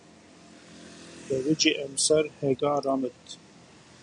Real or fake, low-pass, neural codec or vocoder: real; 9.9 kHz; none